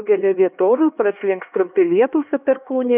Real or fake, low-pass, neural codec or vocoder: fake; 3.6 kHz; codec, 16 kHz, 2 kbps, X-Codec, HuBERT features, trained on LibriSpeech